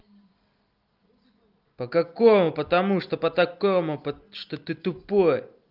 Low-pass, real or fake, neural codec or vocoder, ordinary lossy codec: 5.4 kHz; real; none; Opus, 24 kbps